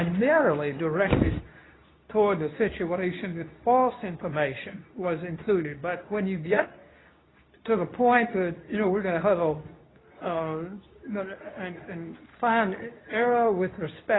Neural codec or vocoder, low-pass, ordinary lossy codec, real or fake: codec, 24 kHz, 0.9 kbps, WavTokenizer, medium speech release version 2; 7.2 kHz; AAC, 16 kbps; fake